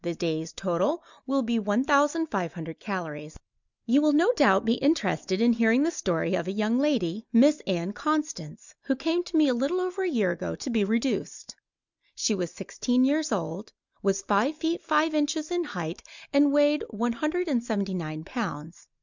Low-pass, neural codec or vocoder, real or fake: 7.2 kHz; none; real